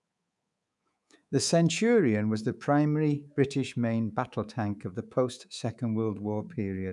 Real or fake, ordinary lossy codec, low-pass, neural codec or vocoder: fake; none; 10.8 kHz; codec, 24 kHz, 3.1 kbps, DualCodec